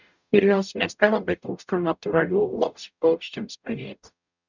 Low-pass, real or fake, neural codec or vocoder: 7.2 kHz; fake; codec, 44.1 kHz, 0.9 kbps, DAC